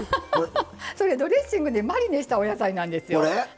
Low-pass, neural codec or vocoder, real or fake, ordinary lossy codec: none; none; real; none